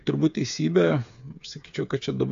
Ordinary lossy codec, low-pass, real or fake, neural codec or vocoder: AAC, 64 kbps; 7.2 kHz; fake; codec, 16 kHz, 8 kbps, FreqCodec, smaller model